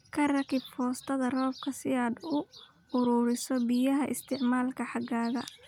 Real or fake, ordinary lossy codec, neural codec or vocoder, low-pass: real; none; none; 19.8 kHz